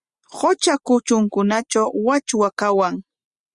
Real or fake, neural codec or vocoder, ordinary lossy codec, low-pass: real; none; Opus, 64 kbps; 10.8 kHz